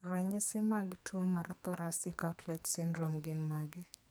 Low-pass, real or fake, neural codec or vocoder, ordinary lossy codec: none; fake; codec, 44.1 kHz, 2.6 kbps, SNAC; none